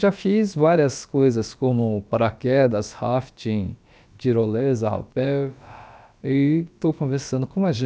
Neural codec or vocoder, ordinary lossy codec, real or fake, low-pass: codec, 16 kHz, about 1 kbps, DyCAST, with the encoder's durations; none; fake; none